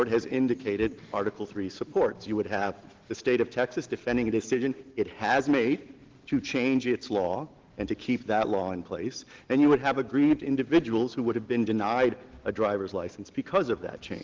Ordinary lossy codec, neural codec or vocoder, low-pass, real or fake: Opus, 16 kbps; none; 7.2 kHz; real